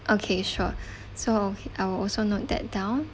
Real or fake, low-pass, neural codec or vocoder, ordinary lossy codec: real; none; none; none